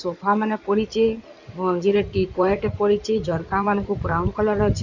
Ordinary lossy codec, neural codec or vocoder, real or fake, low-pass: none; codec, 16 kHz in and 24 kHz out, 2.2 kbps, FireRedTTS-2 codec; fake; 7.2 kHz